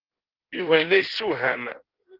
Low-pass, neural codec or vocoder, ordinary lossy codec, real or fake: 5.4 kHz; codec, 16 kHz in and 24 kHz out, 1.1 kbps, FireRedTTS-2 codec; Opus, 16 kbps; fake